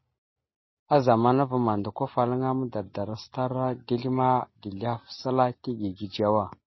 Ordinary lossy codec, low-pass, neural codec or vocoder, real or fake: MP3, 24 kbps; 7.2 kHz; none; real